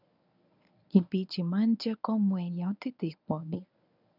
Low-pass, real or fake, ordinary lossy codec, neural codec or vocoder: 5.4 kHz; fake; none; codec, 24 kHz, 0.9 kbps, WavTokenizer, medium speech release version 1